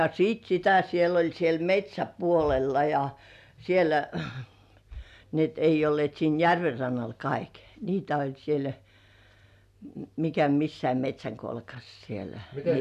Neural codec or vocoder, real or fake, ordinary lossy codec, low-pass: none; real; none; 14.4 kHz